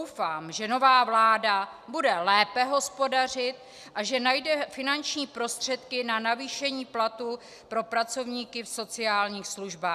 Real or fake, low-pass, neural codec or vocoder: real; 14.4 kHz; none